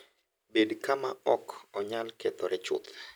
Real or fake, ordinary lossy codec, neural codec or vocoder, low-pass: real; none; none; none